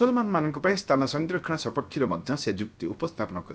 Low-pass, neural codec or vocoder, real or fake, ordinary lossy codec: none; codec, 16 kHz, 0.7 kbps, FocalCodec; fake; none